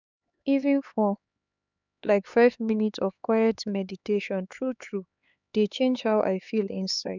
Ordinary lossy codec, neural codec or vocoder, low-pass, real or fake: none; codec, 16 kHz, 4 kbps, X-Codec, HuBERT features, trained on LibriSpeech; 7.2 kHz; fake